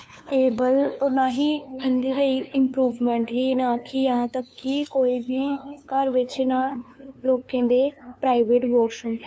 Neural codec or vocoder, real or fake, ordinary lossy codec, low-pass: codec, 16 kHz, 2 kbps, FunCodec, trained on LibriTTS, 25 frames a second; fake; none; none